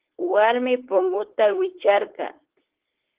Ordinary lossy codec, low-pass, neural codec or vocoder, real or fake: Opus, 16 kbps; 3.6 kHz; codec, 16 kHz, 4.8 kbps, FACodec; fake